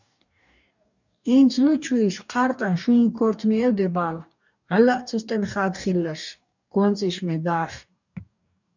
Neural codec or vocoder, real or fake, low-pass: codec, 44.1 kHz, 2.6 kbps, DAC; fake; 7.2 kHz